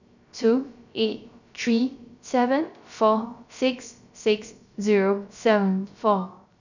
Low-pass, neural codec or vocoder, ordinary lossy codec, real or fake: 7.2 kHz; codec, 16 kHz, 0.3 kbps, FocalCodec; none; fake